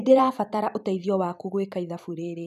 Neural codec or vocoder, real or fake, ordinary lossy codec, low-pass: vocoder, 44.1 kHz, 128 mel bands every 256 samples, BigVGAN v2; fake; none; 14.4 kHz